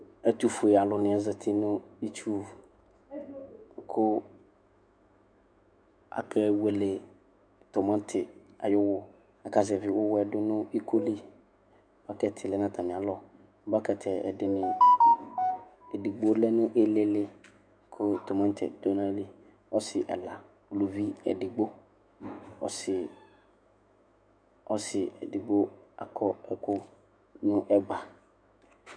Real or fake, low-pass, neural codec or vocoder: fake; 9.9 kHz; autoencoder, 48 kHz, 128 numbers a frame, DAC-VAE, trained on Japanese speech